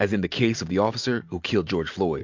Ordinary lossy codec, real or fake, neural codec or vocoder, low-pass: MP3, 64 kbps; real; none; 7.2 kHz